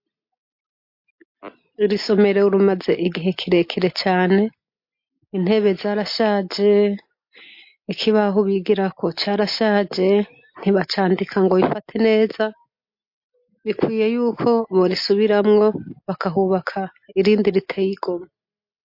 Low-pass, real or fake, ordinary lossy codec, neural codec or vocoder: 5.4 kHz; real; MP3, 32 kbps; none